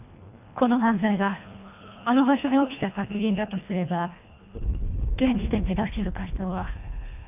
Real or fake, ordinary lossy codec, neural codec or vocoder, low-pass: fake; none; codec, 24 kHz, 1.5 kbps, HILCodec; 3.6 kHz